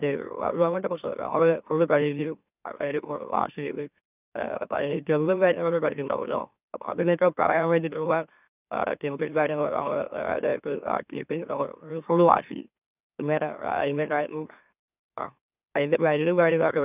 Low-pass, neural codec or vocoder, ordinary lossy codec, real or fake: 3.6 kHz; autoencoder, 44.1 kHz, a latent of 192 numbers a frame, MeloTTS; none; fake